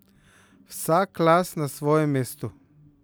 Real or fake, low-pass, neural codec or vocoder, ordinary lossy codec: real; none; none; none